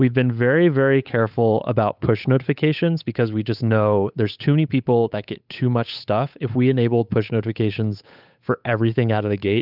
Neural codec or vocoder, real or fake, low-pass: codec, 16 kHz, 8 kbps, FunCodec, trained on Chinese and English, 25 frames a second; fake; 5.4 kHz